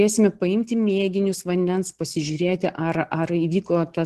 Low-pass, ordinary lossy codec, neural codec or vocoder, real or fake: 14.4 kHz; Opus, 16 kbps; none; real